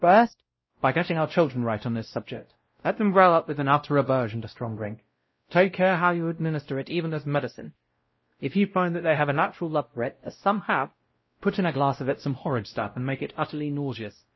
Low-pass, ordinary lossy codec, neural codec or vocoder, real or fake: 7.2 kHz; MP3, 24 kbps; codec, 16 kHz, 0.5 kbps, X-Codec, WavLM features, trained on Multilingual LibriSpeech; fake